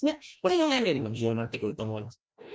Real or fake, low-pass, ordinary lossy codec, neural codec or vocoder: fake; none; none; codec, 16 kHz, 1 kbps, FreqCodec, larger model